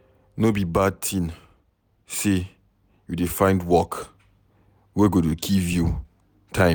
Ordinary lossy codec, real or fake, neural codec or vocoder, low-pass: none; real; none; none